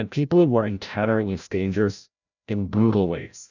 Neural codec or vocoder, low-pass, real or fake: codec, 16 kHz, 0.5 kbps, FreqCodec, larger model; 7.2 kHz; fake